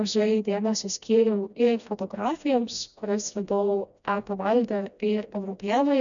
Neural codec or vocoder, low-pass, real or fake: codec, 16 kHz, 1 kbps, FreqCodec, smaller model; 7.2 kHz; fake